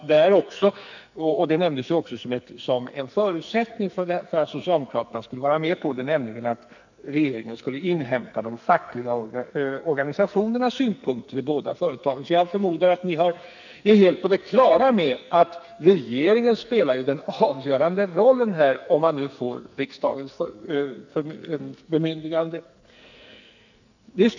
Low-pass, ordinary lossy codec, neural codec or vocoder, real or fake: 7.2 kHz; none; codec, 44.1 kHz, 2.6 kbps, SNAC; fake